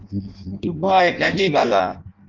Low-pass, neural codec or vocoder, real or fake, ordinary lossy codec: 7.2 kHz; codec, 16 kHz in and 24 kHz out, 0.6 kbps, FireRedTTS-2 codec; fake; Opus, 32 kbps